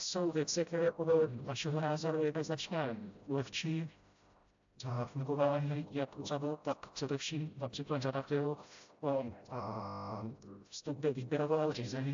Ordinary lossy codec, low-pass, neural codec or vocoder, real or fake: AAC, 64 kbps; 7.2 kHz; codec, 16 kHz, 0.5 kbps, FreqCodec, smaller model; fake